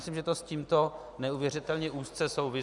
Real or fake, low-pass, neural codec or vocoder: real; 10.8 kHz; none